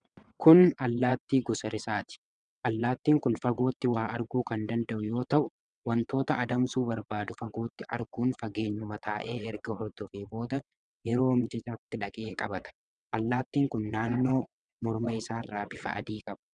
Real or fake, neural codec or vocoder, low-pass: fake; vocoder, 22.05 kHz, 80 mel bands, WaveNeXt; 9.9 kHz